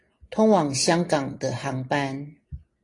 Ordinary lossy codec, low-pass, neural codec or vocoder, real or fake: AAC, 32 kbps; 10.8 kHz; none; real